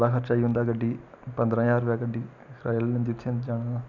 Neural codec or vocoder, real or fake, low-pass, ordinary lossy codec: none; real; 7.2 kHz; none